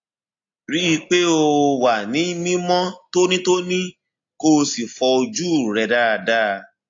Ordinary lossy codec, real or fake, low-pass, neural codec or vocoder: AAC, 48 kbps; real; 7.2 kHz; none